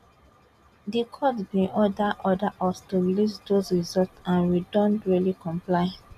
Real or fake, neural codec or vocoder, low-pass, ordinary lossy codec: real; none; 14.4 kHz; none